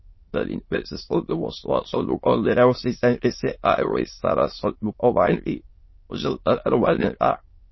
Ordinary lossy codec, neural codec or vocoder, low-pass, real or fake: MP3, 24 kbps; autoencoder, 22.05 kHz, a latent of 192 numbers a frame, VITS, trained on many speakers; 7.2 kHz; fake